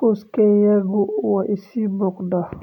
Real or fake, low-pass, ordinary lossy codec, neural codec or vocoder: real; 19.8 kHz; none; none